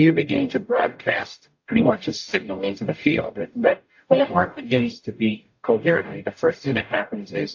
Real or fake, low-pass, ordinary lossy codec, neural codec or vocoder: fake; 7.2 kHz; AAC, 48 kbps; codec, 44.1 kHz, 0.9 kbps, DAC